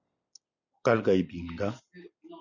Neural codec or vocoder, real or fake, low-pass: none; real; 7.2 kHz